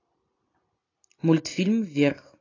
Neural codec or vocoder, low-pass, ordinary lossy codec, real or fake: none; 7.2 kHz; AAC, 32 kbps; real